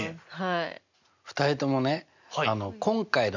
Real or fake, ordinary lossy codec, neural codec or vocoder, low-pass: real; none; none; 7.2 kHz